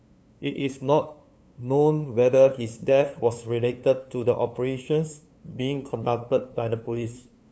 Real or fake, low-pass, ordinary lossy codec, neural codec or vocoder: fake; none; none; codec, 16 kHz, 2 kbps, FunCodec, trained on LibriTTS, 25 frames a second